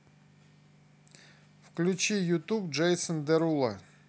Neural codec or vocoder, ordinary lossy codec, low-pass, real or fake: none; none; none; real